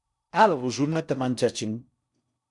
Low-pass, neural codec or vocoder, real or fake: 10.8 kHz; codec, 16 kHz in and 24 kHz out, 0.6 kbps, FocalCodec, streaming, 4096 codes; fake